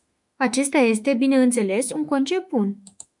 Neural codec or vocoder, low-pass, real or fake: autoencoder, 48 kHz, 32 numbers a frame, DAC-VAE, trained on Japanese speech; 10.8 kHz; fake